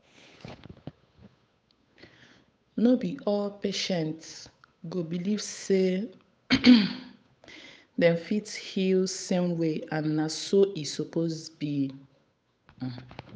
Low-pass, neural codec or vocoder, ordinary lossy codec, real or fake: none; codec, 16 kHz, 8 kbps, FunCodec, trained on Chinese and English, 25 frames a second; none; fake